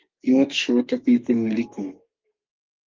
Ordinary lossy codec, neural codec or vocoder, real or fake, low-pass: Opus, 32 kbps; codec, 32 kHz, 1.9 kbps, SNAC; fake; 7.2 kHz